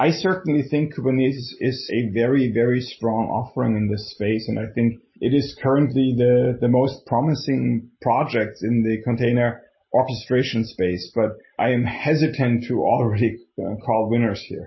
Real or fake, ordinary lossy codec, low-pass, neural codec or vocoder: real; MP3, 24 kbps; 7.2 kHz; none